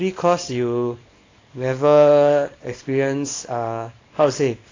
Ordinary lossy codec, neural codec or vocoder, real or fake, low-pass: AAC, 32 kbps; codec, 24 kHz, 0.9 kbps, WavTokenizer, small release; fake; 7.2 kHz